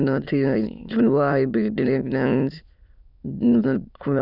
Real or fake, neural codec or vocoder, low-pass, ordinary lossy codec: fake; autoencoder, 22.05 kHz, a latent of 192 numbers a frame, VITS, trained on many speakers; 5.4 kHz; none